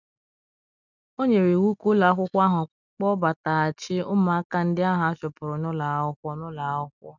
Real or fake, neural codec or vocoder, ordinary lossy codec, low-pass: real; none; none; 7.2 kHz